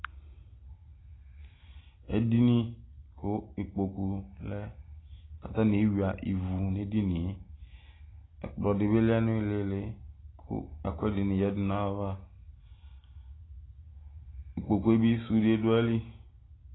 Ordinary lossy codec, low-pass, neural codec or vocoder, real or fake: AAC, 16 kbps; 7.2 kHz; none; real